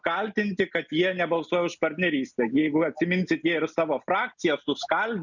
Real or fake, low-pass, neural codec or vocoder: real; 7.2 kHz; none